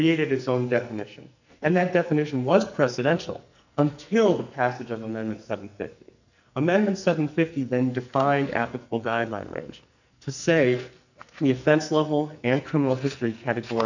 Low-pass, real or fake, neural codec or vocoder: 7.2 kHz; fake; codec, 44.1 kHz, 2.6 kbps, SNAC